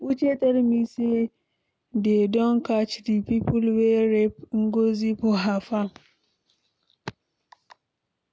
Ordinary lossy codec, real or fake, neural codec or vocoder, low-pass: none; real; none; none